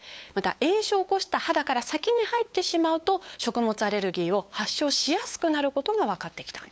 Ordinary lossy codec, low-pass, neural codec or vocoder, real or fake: none; none; codec, 16 kHz, 8 kbps, FunCodec, trained on LibriTTS, 25 frames a second; fake